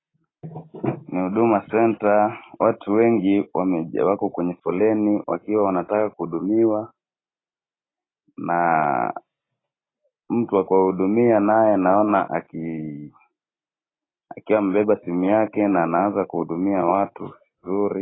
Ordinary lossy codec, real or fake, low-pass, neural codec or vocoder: AAC, 16 kbps; real; 7.2 kHz; none